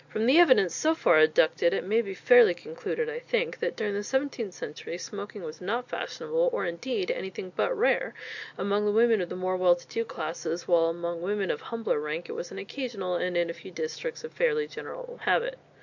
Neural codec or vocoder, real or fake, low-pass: none; real; 7.2 kHz